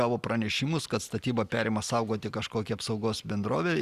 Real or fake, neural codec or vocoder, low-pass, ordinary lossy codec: real; none; 14.4 kHz; Opus, 64 kbps